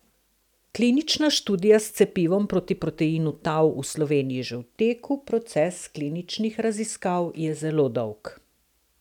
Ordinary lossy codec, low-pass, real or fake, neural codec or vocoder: none; 19.8 kHz; real; none